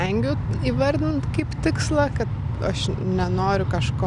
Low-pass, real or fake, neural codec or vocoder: 10.8 kHz; real; none